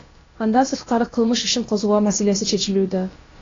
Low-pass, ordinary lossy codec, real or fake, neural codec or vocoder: 7.2 kHz; AAC, 32 kbps; fake; codec, 16 kHz, about 1 kbps, DyCAST, with the encoder's durations